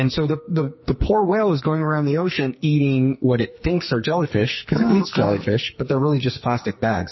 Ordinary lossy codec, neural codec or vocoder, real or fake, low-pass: MP3, 24 kbps; codec, 44.1 kHz, 2.6 kbps, SNAC; fake; 7.2 kHz